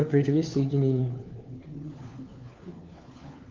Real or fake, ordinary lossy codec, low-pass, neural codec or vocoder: fake; Opus, 32 kbps; 7.2 kHz; codec, 16 kHz, 4 kbps, FunCodec, trained on LibriTTS, 50 frames a second